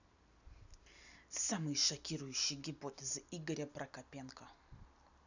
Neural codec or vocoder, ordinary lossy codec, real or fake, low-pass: none; none; real; 7.2 kHz